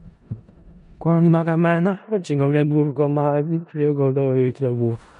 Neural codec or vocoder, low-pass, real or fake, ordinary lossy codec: codec, 16 kHz in and 24 kHz out, 0.4 kbps, LongCat-Audio-Codec, four codebook decoder; 10.8 kHz; fake; MP3, 64 kbps